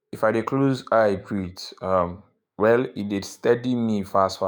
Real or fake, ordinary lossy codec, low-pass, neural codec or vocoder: fake; none; none; autoencoder, 48 kHz, 128 numbers a frame, DAC-VAE, trained on Japanese speech